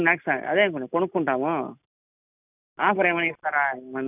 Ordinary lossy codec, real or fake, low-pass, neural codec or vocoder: none; real; 3.6 kHz; none